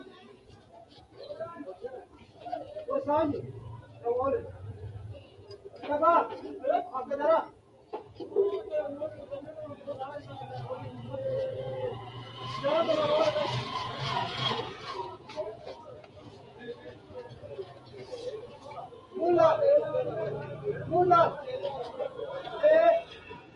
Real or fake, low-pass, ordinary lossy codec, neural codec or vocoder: fake; 14.4 kHz; MP3, 48 kbps; vocoder, 48 kHz, 128 mel bands, Vocos